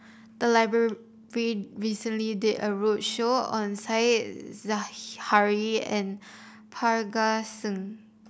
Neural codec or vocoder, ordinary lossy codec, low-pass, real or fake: none; none; none; real